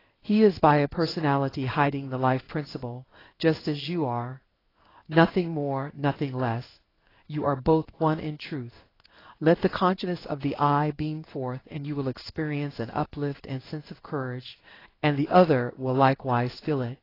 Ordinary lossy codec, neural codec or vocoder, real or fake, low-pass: AAC, 24 kbps; codec, 16 kHz in and 24 kHz out, 1 kbps, XY-Tokenizer; fake; 5.4 kHz